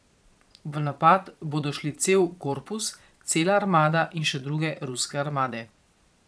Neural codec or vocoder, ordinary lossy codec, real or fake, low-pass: vocoder, 22.05 kHz, 80 mel bands, Vocos; none; fake; none